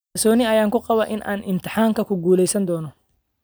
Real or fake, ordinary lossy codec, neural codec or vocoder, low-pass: real; none; none; none